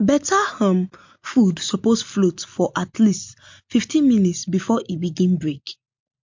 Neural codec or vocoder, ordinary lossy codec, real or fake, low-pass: none; MP3, 48 kbps; real; 7.2 kHz